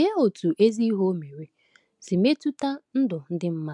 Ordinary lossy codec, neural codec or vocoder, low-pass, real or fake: MP3, 96 kbps; none; 10.8 kHz; real